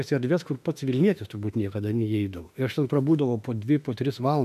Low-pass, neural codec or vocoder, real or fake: 14.4 kHz; autoencoder, 48 kHz, 32 numbers a frame, DAC-VAE, trained on Japanese speech; fake